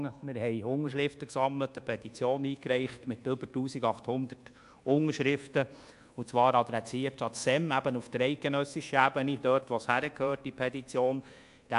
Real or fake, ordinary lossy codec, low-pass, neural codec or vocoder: fake; AAC, 64 kbps; 10.8 kHz; codec, 24 kHz, 1.2 kbps, DualCodec